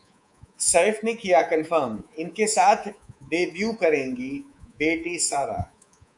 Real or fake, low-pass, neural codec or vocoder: fake; 10.8 kHz; codec, 24 kHz, 3.1 kbps, DualCodec